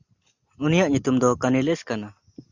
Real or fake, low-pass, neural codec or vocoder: real; 7.2 kHz; none